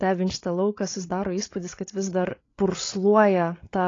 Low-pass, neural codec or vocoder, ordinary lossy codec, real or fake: 7.2 kHz; codec, 16 kHz, 8 kbps, FreqCodec, larger model; AAC, 32 kbps; fake